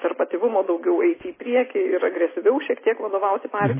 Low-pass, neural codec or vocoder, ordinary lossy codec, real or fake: 3.6 kHz; none; MP3, 16 kbps; real